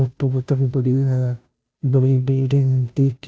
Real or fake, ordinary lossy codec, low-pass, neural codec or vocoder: fake; none; none; codec, 16 kHz, 0.5 kbps, FunCodec, trained on Chinese and English, 25 frames a second